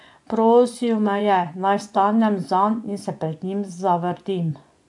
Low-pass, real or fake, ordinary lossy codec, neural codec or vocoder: 10.8 kHz; fake; AAC, 64 kbps; vocoder, 24 kHz, 100 mel bands, Vocos